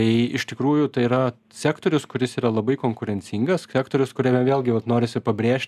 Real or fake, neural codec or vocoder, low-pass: real; none; 14.4 kHz